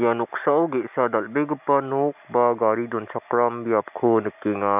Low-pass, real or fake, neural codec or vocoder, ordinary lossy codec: 3.6 kHz; real; none; none